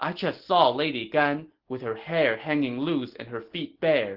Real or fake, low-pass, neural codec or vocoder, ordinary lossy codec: real; 5.4 kHz; none; Opus, 16 kbps